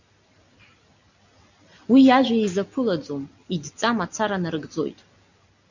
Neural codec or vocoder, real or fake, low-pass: none; real; 7.2 kHz